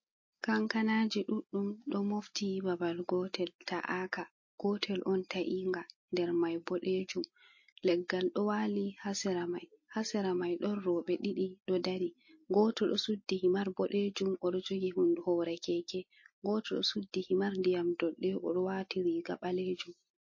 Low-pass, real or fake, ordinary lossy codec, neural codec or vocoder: 7.2 kHz; real; MP3, 32 kbps; none